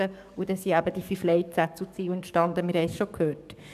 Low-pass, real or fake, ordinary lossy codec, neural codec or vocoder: 14.4 kHz; fake; none; codec, 44.1 kHz, 7.8 kbps, DAC